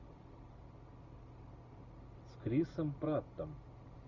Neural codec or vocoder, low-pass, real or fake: none; 7.2 kHz; real